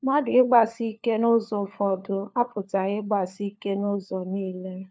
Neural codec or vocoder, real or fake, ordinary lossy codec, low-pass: codec, 16 kHz, 4 kbps, FunCodec, trained on LibriTTS, 50 frames a second; fake; none; none